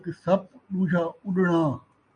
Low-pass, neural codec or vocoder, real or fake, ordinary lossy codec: 9.9 kHz; none; real; MP3, 48 kbps